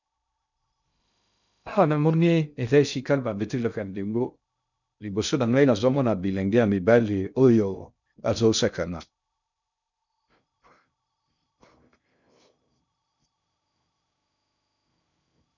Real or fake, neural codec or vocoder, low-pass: fake; codec, 16 kHz in and 24 kHz out, 0.6 kbps, FocalCodec, streaming, 2048 codes; 7.2 kHz